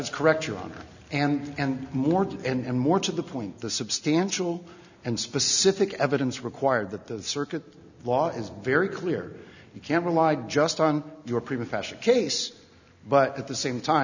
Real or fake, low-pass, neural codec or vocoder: real; 7.2 kHz; none